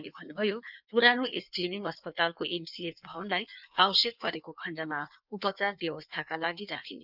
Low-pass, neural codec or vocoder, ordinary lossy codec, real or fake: 5.4 kHz; codec, 16 kHz in and 24 kHz out, 1.1 kbps, FireRedTTS-2 codec; none; fake